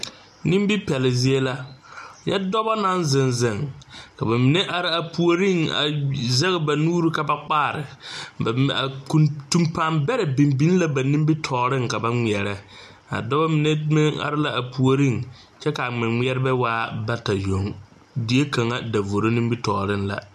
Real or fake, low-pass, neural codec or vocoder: real; 14.4 kHz; none